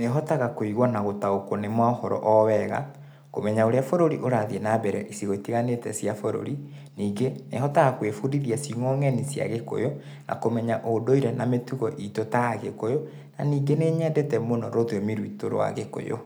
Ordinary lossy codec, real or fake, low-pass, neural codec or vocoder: none; real; none; none